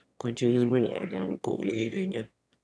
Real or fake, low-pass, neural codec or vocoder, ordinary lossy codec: fake; none; autoencoder, 22.05 kHz, a latent of 192 numbers a frame, VITS, trained on one speaker; none